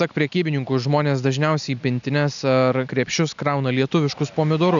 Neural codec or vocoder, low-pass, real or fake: none; 7.2 kHz; real